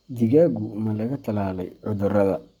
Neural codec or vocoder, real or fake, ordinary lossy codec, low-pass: codec, 44.1 kHz, 7.8 kbps, Pupu-Codec; fake; none; 19.8 kHz